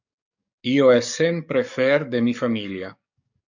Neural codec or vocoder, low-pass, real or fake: codec, 44.1 kHz, 7.8 kbps, DAC; 7.2 kHz; fake